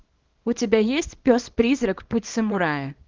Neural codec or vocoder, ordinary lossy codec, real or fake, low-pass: codec, 24 kHz, 0.9 kbps, WavTokenizer, small release; Opus, 16 kbps; fake; 7.2 kHz